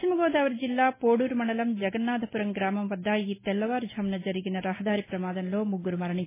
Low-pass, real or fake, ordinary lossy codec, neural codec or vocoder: 3.6 kHz; real; MP3, 16 kbps; none